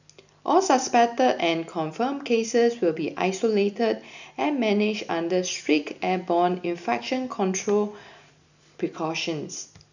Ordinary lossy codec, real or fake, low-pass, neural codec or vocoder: none; real; 7.2 kHz; none